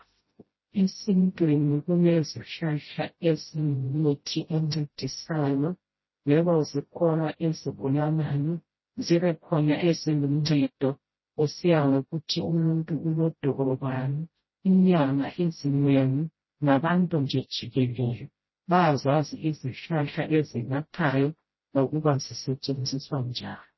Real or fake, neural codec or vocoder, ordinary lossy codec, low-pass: fake; codec, 16 kHz, 0.5 kbps, FreqCodec, smaller model; MP3, 24 kbps; 7.2 kHz